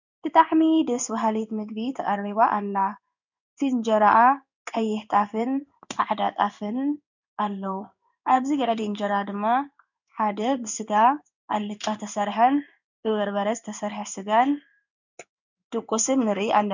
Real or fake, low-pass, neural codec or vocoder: fake; 7.2 kHz; codec, 16 kHz in and 24 kHz out, 1 kbps, XY-Tokenizer